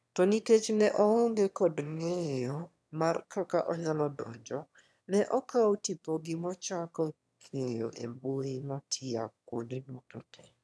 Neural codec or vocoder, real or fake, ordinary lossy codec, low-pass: autoencoder, 22.05 kHz, a latent of 192 numbers a frame, VITS, trained on one speaker; fake; none; none